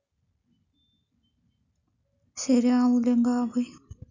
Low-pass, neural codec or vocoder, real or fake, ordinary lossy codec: 7.2 kHz; none; real; none